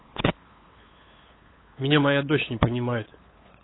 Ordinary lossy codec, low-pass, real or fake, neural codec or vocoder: AAC, 16 kbps; 7.2 kHz; fake; codec, 16 kHz, 8 kbps, FunCodec, trained on LibriTTS, 25 frames a second